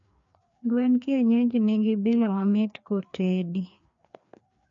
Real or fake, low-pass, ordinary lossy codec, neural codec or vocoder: fake; 7.2 kHz; MP3, 64 kbps; codec, 16 kHz, 2 kbps, FreqCodec, larger model